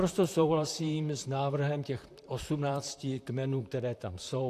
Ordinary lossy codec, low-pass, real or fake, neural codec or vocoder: AAC, 64 kbps; 14.4 kHz; fake; vocoder, 44.1 kHz, 128 mel bands, Pupu-Vocoder